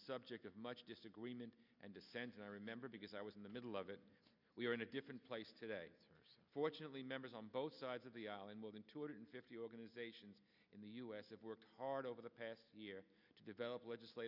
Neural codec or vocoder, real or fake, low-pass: none; real; 5.4 kHz